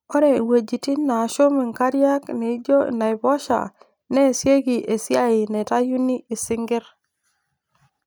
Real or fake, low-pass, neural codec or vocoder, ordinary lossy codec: real; none; none; none